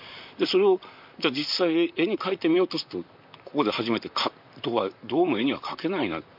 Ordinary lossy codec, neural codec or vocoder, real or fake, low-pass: MP3, 48 kbps; vocoder, 44.1 kHz, 128 mel bands, Pupu-Vocoder; fake; 5.4 kHz